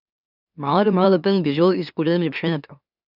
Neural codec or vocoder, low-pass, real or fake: autoencoder, 44.1 kHz, a latent of 192 numbers a frame, MeloTTS; 5.4 kHz; fake